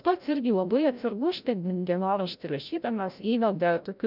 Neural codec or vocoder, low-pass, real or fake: codec, 16 kHz, 0.5 kbps, FreqCodec, larger model; 5.4 kHz; fake